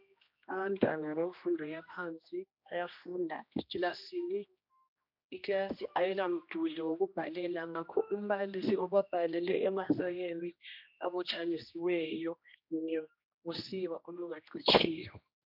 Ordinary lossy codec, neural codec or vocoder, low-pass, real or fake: MP3, 48 kbps; codec, 16 kHz, 1 kbps, X-Codec, HuBERT features, trained on general audio; 5.4 kHz; fake